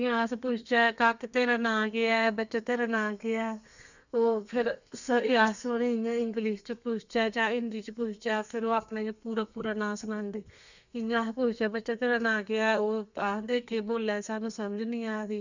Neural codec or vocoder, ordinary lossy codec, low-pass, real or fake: codec, 32 kHz, 1.9 kbps, SNAC; none; 7.2 kHz; fake